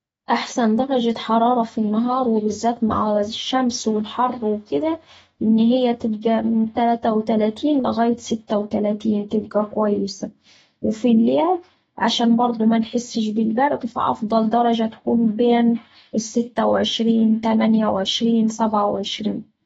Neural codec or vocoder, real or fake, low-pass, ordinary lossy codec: autoencoder, 48 kHz, 128 numbers a frame, DAC-VAE, trained on Japanese speech; fake; 19.8 kHz; AAC, 24 kbps